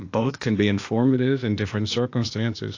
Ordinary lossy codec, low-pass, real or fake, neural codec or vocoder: AAC, 48 kbps; 7.2 kHz; fake; codec, 16 kHz, 0.8 kbps, ZipCodec